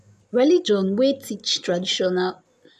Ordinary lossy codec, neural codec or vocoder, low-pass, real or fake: none; none; 14.4 kHz; real